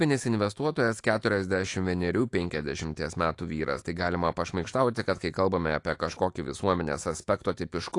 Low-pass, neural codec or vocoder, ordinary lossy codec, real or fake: 10.8 kHz; autoencoder, 48 kHz, 128 numbers a frame, DAC-VAE, trained on Japanese speech; AAC, 48 kbps; fake